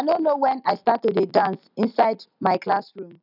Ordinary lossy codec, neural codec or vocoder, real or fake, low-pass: none; none; real; 5.4 kHz